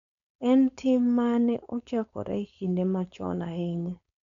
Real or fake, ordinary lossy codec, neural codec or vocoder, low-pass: fake; none; codec, 16 kHz, 4.8 kbps, FACodec; 7.2 kHz